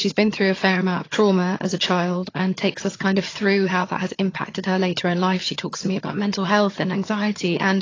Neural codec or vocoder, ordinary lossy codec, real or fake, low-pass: vocoder, 22.05 kHz, 80 mel bands, HiFi-GAN; AAC, 32 kbps; fake; 7.2 kHz